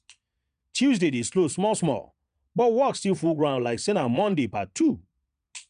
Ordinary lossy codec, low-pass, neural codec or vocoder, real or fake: none; 9.9 kHz; vocoder, 22.05 kHz, 80 mel bands, Vocos; fake